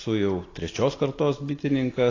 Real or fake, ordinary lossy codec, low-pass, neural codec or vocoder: fake; AAC, 32 kbps; 7.2 kHz; vocoder, 44.1 kHz, 128 mel bands every 256 samples, BigVGAN v2